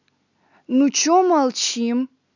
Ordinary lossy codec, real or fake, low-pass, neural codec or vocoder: none; real; 7.2 kHz; none